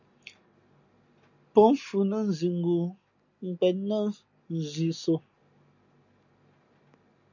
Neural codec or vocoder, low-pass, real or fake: none; 7.2 kHz; real